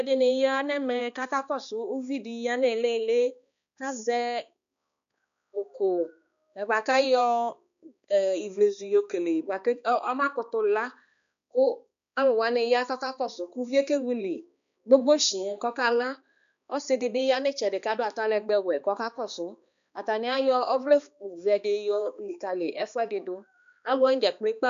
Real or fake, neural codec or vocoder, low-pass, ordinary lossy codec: fake; codec, 16 kHz, 2 kbps, X-Codec, HuBERT features, trained on balanced general audio; 7.2 kHz; MP3, 96 kbps